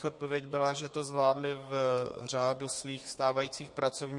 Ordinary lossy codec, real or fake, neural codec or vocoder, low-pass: MP3, 48 kbps; fake; codec, 32 kHz, 1.9 kbps, SNAC; 10.8 kHz